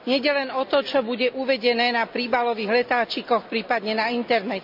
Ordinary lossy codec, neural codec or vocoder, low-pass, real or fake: MP3, 48 kbps; none; 5.4 kHz; real